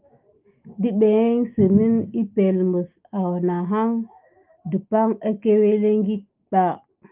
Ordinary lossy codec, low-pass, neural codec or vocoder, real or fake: Opus, 24 kbps; 3.6 kHz; autoencoder, 48 kHz, 128 numbers a frame, DAC-VAE, trained on Japanese speech; fake